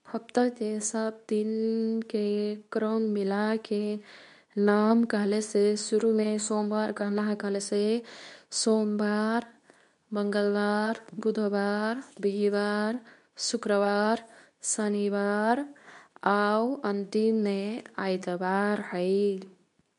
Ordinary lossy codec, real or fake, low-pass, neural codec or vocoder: none; fake; 10.8 kHz; codec, 24 kHz, 0.9 kbps, WavTokenizer, medium speech release version 2